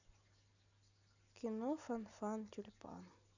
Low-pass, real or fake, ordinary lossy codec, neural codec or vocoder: 7.2 kHz; real; none; none